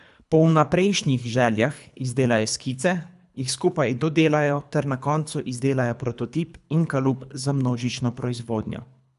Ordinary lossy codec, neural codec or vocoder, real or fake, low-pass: none; codec, 24 kHz, 3 kbps, HILCodec; fake; 10.8 kHz